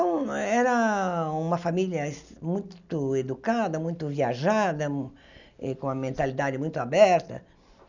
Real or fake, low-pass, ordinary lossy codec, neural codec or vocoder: real; 7.2 kHz; none; none